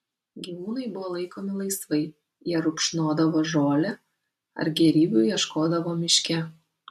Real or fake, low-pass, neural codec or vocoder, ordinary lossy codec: real; 14.4 kHz; none; MP3, 64 kbps